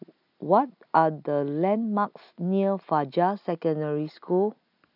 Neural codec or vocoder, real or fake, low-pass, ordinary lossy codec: none; real; 5.4 kHz; none